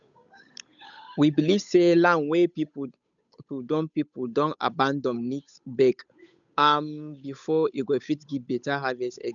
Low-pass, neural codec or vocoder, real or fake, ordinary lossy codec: 7.2 kHz; codec, 16 kHz, 8 kbps, FunCodec, trained on Chinese and English, 25 frames a second; fake; none